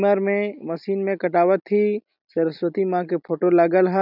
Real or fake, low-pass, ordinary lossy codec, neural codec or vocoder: real; 5.4 kHz; none; none